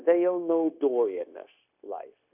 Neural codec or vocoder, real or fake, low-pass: codec, 16 kHz, 0.9 kbps, LongCat-Audio-Codec; fake; 3.6 kHz